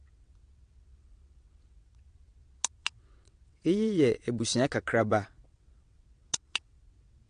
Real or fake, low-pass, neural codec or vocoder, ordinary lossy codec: fake; 9.9 kHz; vocoder, 22.05 kHz, 80 mel bands, WaveNeXt; MP3, 48 kbps